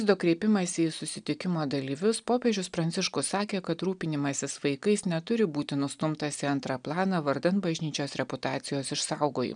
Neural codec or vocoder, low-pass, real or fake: none; 9.9 kHz; real